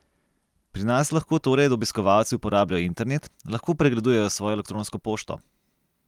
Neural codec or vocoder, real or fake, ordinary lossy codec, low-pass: none; real; Opus, 32 kbps; 19.8 kHz